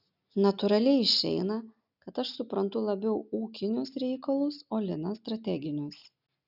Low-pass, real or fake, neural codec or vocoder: 5.4 kHz; real; none